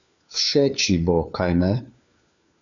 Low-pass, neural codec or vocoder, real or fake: 7.2 kHz; codec, 16 kHz, 4 kbps, FunCodec, trained on LibriTTS, 50 frames a second; fake